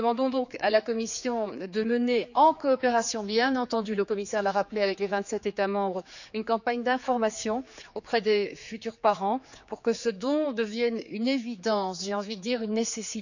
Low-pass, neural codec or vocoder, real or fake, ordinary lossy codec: 7.2 kHz; codec, 16 kHz, 4 kbps, X-Codec, HuBERT features, trained on general audio; fake; none